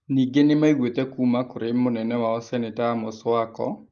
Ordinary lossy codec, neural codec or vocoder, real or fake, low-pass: Opus, 32 kbps; none; real; 7.2 kHz